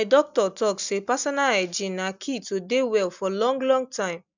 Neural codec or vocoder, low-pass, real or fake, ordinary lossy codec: none; 7.2 kHz; real; none